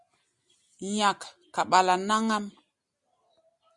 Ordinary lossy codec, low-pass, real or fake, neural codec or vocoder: Opus, 64 kbps; 10.8 kHz; real; none